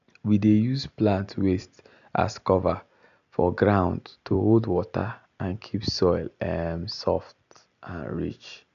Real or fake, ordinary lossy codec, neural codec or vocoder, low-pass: real; none; none; 7.2 kHz